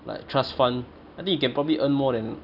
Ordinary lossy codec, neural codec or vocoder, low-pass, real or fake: none; none; 5.4 kHz; real